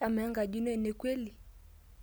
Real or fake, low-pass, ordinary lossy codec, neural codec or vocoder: real; none; none; none